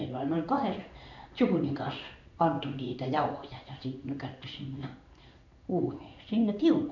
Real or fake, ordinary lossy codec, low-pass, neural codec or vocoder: fake; none; 7.2 kHz; codec, 16 kHz in and 24 kHz out, 1 kbps, XY-Tokenizer